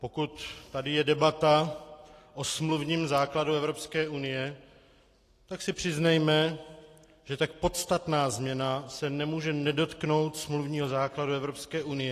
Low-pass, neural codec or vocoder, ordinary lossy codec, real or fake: 14.4 kHz; none; AAC, 48 kbps; real